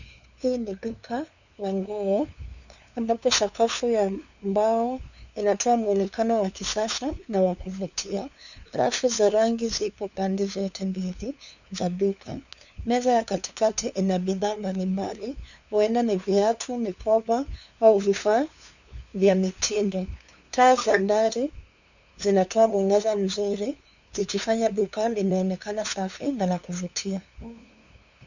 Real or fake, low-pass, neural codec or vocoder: fake; 7.2 kHz; codec, 16 kHz, 2 kbps, FunCodec, trained on LibriTTS, 25 frames a second